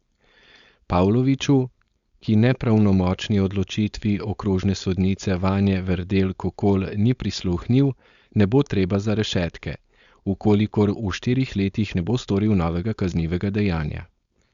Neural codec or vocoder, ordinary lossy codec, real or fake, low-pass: codec, 16 kHz, 4.8 kbps, FACodec; Opus, 64 kbps; fake; 7.2 kHz